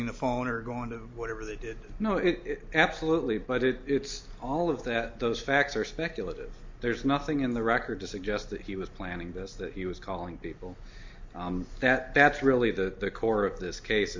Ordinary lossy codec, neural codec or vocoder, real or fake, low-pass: MP3, 48 kbps; none; real; 7.2 kHz